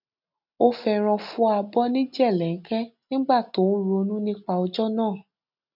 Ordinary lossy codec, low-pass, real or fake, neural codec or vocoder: none; 5.4 kHz; real; none